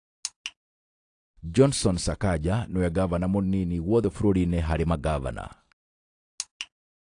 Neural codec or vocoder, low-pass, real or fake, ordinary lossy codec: none; 9.9 kHz; real; AAC, 48 kbps